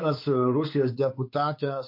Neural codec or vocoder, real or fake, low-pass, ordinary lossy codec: codec, 16 kHz, 4 kbps, X-Codec, WavLM features, trained on Multilingual LibriSpeech; fake; 5.4 kHz; MP3, 32 kbps